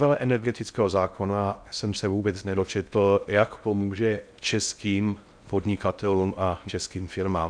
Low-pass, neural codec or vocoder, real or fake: 9.9 kHz; codec, 16 kHz in and 24 kHz out, 0.6 kbps, FocalCodec, streaming, 4096 codes; fake